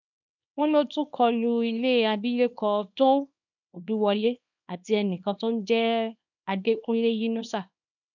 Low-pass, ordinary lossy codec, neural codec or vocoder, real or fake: 7.2 kHz; none; codec, 24 kHz, 0.9 kbps, WavTokenizer, small release; fake